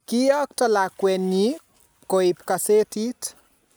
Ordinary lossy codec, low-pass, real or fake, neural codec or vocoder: none; none; real; none